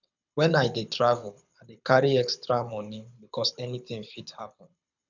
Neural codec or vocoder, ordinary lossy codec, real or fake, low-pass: codec, 24 kHz, 6 kbps, HILCodec; none; fake; 7.2 kHz